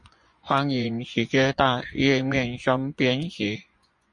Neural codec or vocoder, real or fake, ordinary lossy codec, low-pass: vocoder, 24 kHz, 100 mel bands, Vocos; fake; MP3, 64 kbps; 10.8 kHz